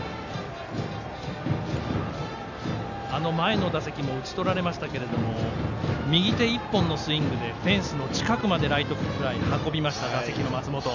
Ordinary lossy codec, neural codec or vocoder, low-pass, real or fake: none; none; 7.2 kHz; real